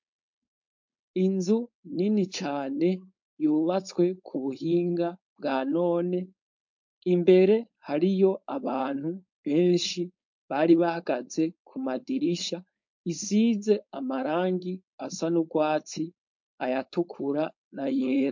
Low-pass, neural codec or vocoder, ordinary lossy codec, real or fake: 7.2 kHz; codec, 16 kHz, 4.8 kbps, FACodec; MP3, 48 kbps; fake